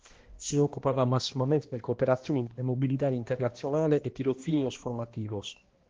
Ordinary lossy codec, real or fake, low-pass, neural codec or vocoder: Opus, 16 kbps; fake; 7.2 kHz; codec, 16 kHz, 1 kbps, X-Codec, HuBERT features, trained on balanced general audio